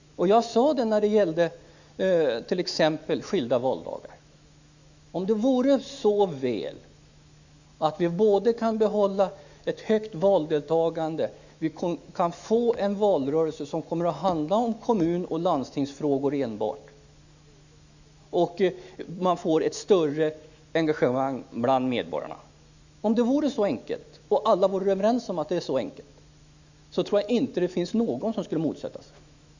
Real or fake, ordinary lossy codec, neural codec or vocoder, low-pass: fake; Opus, 64 kbps; autoencoder, 48 kHz, 128 numbers a frame, DAC-VAE, trained on Japanese speech; 7.2 kHz